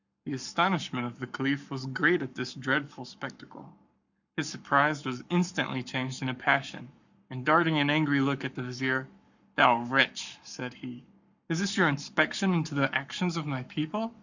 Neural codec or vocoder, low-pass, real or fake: codec, 44.1 kHz, 7.8 kbps, Pupu-Codec; 7.2 kHz; fake